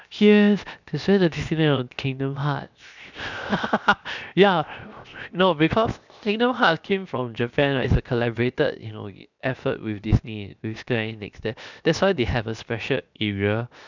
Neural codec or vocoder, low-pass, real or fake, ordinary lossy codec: codec, 16 kHz, 0.7 kbps, FocalCodec; 7.2 kHz; fake; none